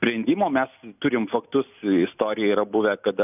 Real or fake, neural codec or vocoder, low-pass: real; none; 3.6 kHz